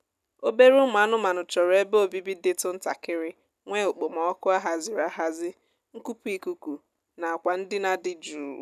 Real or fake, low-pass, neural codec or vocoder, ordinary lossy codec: real; 14.4 kHz; none; none